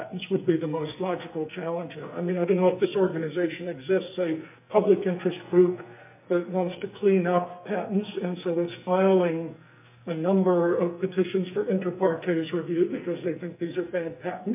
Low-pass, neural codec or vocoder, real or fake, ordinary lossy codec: 3.6 kHz; codec, 44.1 kHz, 2.6 kbps, SNAC; fake; MP3, 32 kbps